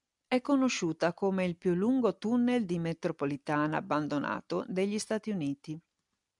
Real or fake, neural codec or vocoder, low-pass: real; none; 10.8 kHz